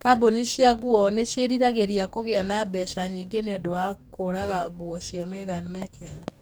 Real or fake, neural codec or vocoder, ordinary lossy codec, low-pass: fake; codec, 44.1 kHz, 2.6 kbps, DAC; none; none